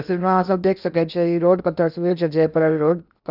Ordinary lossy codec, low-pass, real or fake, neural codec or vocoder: none; 5.4 kHz; fake; codec, 16 kHz in and 24 kHz out, 0.8 kbps, FocalCodec, streaming, 65536 codes